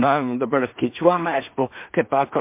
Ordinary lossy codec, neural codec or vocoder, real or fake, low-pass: MP3, 32 kbps; codec, 16 kHz in and 24 kHz out, 0.4 kbps, LongCat-Audio-Codec, two codebook decoder; fake; 3.6 kHz